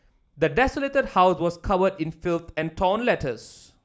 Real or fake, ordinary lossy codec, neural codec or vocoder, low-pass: real; none; none; none